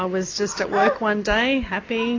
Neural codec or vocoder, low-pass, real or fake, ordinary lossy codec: none; 7.2 kHz; real; AAC, 32 kbps